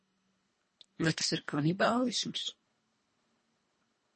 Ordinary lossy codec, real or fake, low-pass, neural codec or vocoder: MP3, 32 kbps; fake; 10.8 kHz; codec, 24 kHz, 1.5 kbps, HILCodec